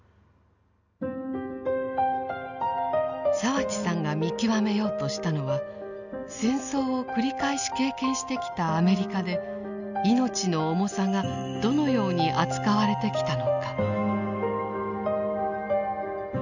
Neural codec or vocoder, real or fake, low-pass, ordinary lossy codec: none; real; 7.2 kHz; none